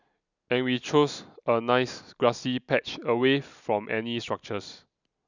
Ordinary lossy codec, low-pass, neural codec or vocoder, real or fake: none; 7.2 kHz; none; real